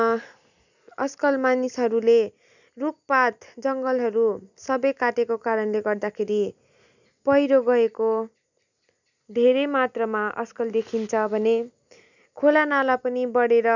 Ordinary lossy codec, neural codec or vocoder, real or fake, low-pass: none; none; real; 7.2 kHz